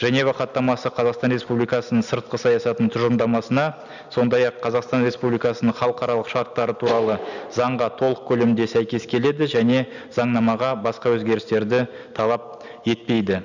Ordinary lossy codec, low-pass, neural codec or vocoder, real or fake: none; 7.2 kHz; none; real